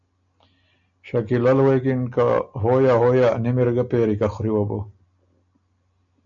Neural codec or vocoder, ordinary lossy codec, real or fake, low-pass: none; MP3, 64 kbps; real; 7.2 kHz